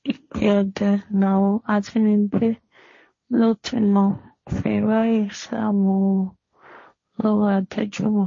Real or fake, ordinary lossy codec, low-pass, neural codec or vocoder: fake; MP3, 32 kbps; 7.2 kHz; codec, 16 kHz, 1.1 kbps, Voila-Tokenizer